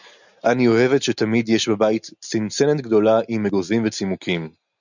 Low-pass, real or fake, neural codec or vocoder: 7.2 kHz; real; none